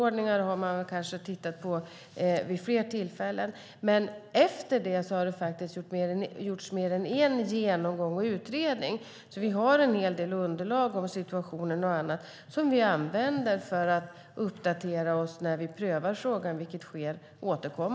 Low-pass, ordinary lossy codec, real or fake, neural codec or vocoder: none; none; real; none